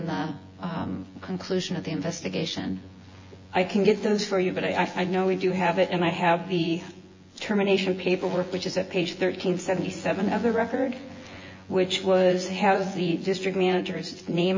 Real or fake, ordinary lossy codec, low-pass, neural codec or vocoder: fake; MP3, 32 kbps; 7.2 kHz; vocoder, 24 kHz, 100 mel bands, Vocos